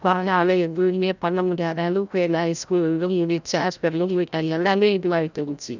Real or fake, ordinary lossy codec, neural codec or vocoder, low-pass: fake; none; codec, 16 kHz, 0.5 kbps, FreqCodec, larger model; 7.2 kHz